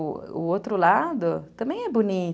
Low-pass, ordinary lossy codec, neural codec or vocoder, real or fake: none; none; none; real